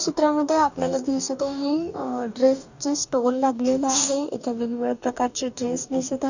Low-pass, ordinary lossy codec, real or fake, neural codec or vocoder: 7.2 kHz; none; fake; codec, 44.1 kHz, 2.6 kbps, DAC